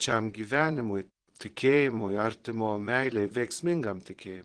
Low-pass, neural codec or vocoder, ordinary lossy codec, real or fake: 9.9 kHz; vocoder, 22.05 kHz, 80 mel bands, Vocos; Opus, 16 kbps; fake